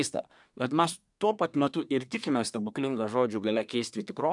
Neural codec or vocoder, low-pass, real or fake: codec, 24 kHz, 1 kbps, SNAC; 10.8 kHz; fake